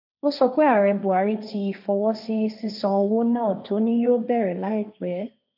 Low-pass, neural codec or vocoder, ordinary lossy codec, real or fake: 5.4 kHz; codec, 16 kHz, 1.1 kbps, Voila-Tokenizer; none; fake